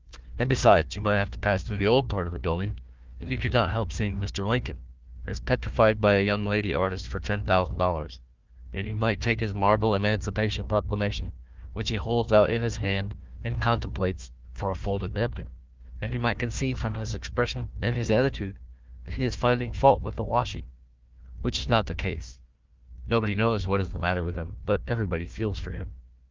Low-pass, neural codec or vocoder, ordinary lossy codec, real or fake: 7.2 kHz; codec, 16 kHz, 1 kbps, FunCodec, trained on Chinese and English, 50 frames a second; Opus, 32 kbps; fake